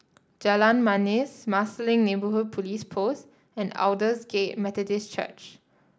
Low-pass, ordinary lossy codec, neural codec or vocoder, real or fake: none; none; none; real